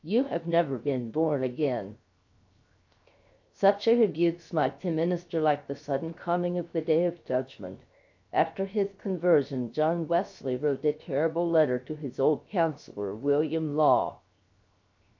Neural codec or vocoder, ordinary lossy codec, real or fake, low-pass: codec, 16 kHz, 0.7 kbps, FocalCodec; AAC, 48 kbps; fake; 7.2 kHz